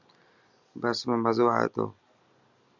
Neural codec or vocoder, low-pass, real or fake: none; 7.2 kHz; real